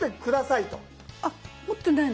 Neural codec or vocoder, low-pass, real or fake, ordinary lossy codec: none; none; real; none